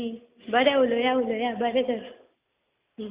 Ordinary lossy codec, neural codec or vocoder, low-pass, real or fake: Opus, 64 kbps; none; 3.6 kHz; real